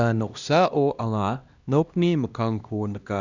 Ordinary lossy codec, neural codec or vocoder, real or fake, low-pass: Opus, 64 kbps; codec, 16 kHz, 1 kbps, X-Codec, HuBERT features, trained on LibriSpeech; fake; 7.2 kHz